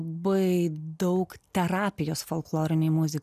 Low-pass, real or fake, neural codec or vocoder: 14.4 kHz; real; none